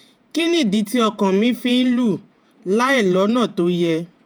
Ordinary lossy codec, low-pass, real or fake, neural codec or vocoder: none; none; fake; vocoder, 48 kHz, 128 mel bands, Vocos